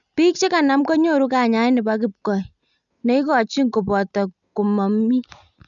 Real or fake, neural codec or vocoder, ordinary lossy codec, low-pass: real; none; none; 7.2 kHz